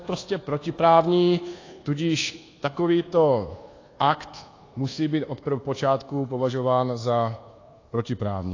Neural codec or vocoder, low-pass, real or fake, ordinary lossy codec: codec, 24 kHz, 1.2 kbps, DualCodec; 7.2 kHz; fake; AAC, 32 kbps